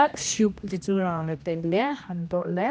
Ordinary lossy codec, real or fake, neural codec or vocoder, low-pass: none; fake; codec, 16 kHz, 1 kbps, X-Codec, HuBERT features, trained on general audio; none